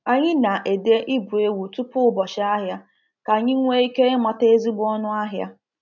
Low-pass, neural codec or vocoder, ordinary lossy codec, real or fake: 7.2 kHz; none; none; real